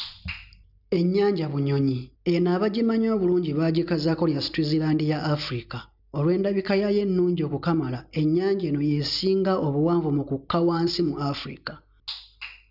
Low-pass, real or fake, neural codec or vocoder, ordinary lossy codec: 5.4 kHz; real; none; none